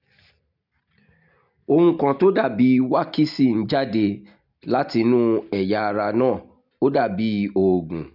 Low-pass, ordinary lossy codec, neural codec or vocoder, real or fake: 5.4 kHz; none; none; real